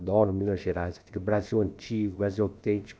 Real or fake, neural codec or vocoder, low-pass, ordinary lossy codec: fake; codec, 16 kHz, about 1 kbps, DyCAST, with the encoder's durations; none; none